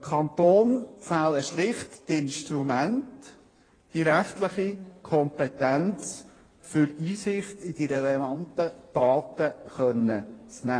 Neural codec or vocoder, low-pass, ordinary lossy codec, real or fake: codec, 16 kHz in and 24 kHz out, 1.1 kbps, FireRedTTS-2 codec; 9.9 kHz; AAC, 32 kbps; fake